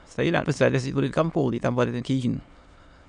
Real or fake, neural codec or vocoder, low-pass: fake; autoencoder, 22.05 kHz, a latent of 192 numbers a frame, VITS, trained on many speakers; 9.9 kHz